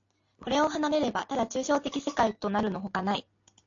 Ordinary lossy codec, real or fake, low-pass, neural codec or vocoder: AAC, 48 kbps; real; 7.2 kHz; none